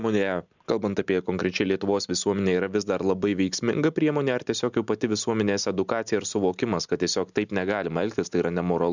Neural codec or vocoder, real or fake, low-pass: none; real; 7.2 kHz